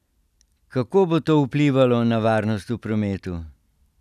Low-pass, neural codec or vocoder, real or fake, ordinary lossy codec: 14.4 kHz; none; real; none